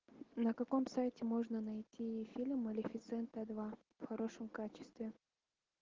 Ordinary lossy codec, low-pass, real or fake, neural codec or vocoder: Opus, 16 kbps; 7.2 kHz; real; none